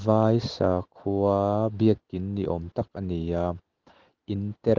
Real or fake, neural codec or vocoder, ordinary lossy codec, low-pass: real; none; Opus, 16 kbps; 7.2 kHz